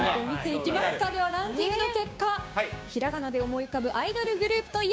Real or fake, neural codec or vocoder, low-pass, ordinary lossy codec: fake; codec, 16 kHz, 6 kbps, DAC; none; none